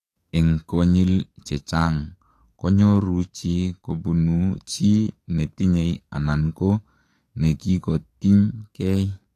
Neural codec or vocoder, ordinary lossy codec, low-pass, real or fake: codec, 44.1 kHz, 7.8 kbps, DAC; AAC, 48 kbps; 14.4 kHz; fake